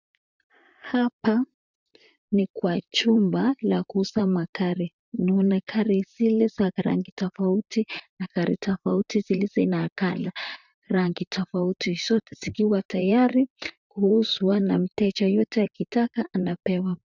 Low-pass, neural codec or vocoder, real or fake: 7.2 kHz; vocoder, 44.1 kHz, 128 mel bands, Pupu-Vocoder; fake